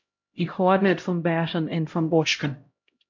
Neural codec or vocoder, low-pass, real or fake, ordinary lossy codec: codec, 16 kHz, 0.5 kbps, X-Codec, HuBERT features, trained on LibriSpeech; 7.2 kHz; fake; MP3, 48 kbps